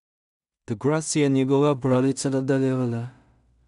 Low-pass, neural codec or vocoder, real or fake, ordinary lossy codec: 10.8 kHz; codec, 16 kHz in and 24 kHz out, 0.4 kbps, LongCat-Audio-Codec, two codebook decoder; fake; none